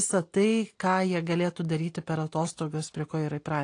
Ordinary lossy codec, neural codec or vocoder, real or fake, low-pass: AAC, 48 kbps; none; real; 9.9 kHz